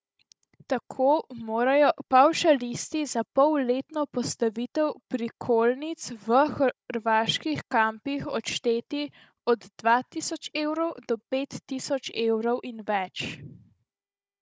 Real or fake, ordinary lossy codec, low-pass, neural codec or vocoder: fake; none; none; codec, 16 kHz, 16 kbps, FunCodec, trained on Chinese and English, 50 frames a second